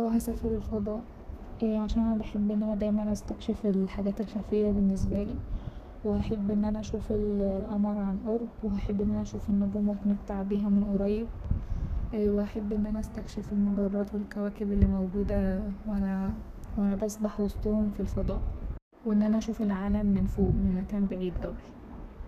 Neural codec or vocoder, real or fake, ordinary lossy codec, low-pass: codec, 32 kHz, 1.9 kbps, SNAC; fake; none; 14.4 kHz